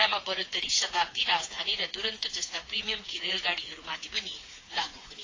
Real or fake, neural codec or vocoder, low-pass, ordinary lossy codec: fake; codec, 16 kHz, 4 kbps, FreqCodec, smaller model; 7.2 kHz; AAC, 32 kbps